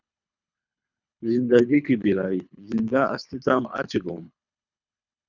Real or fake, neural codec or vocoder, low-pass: fake; codec, 24 kHz, 3 kbps, HILCodec; 7.2 kHz